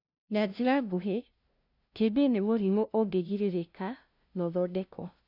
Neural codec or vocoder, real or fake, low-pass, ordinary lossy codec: codec, 16 kHz, 0.5 kbps, FunCodec, trained on LibriTTS, 25 frames a second; fake; 5.4 kHz; none